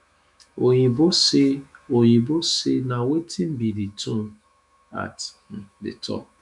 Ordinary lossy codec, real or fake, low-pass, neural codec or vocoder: none; fake; 10.8 kHz; autoencoder, 48 kHz, 128 numbers a frame, DAC-VAE, trained on Japanese speech